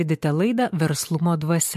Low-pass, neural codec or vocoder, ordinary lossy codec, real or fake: 14.4 kHz; vocoder, 44.1 kHz, 128 mel bands every 512 samples, BigVGAN v2; MP3, 64 kbps; fake